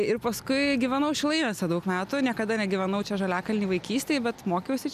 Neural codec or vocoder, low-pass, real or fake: none; 14.4 kHz; real